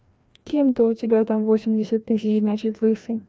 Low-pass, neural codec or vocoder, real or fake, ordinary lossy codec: none; codec, 16 kHz, 1 kbps, FreqCodec, larger model; fake; none